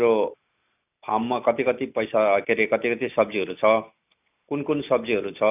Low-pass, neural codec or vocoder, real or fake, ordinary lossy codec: 3.6 kHz; none; real; none